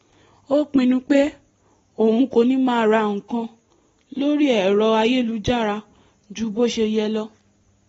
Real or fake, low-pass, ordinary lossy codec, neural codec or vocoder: real; 19.8 kHz; AAC, 24 kbps; none